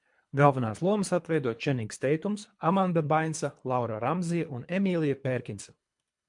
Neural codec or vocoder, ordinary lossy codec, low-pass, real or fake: codec, 24 kHz, 3 kbps, HILCodec; MP3, 64 kbps; 10.8 kHz; fake